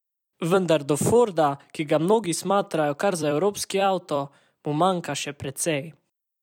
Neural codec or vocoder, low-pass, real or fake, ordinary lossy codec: vocoder, 44.1 kHz, 128 mel bands every 256 samples, BigVGAN v2; 19.8 kHz; fake; none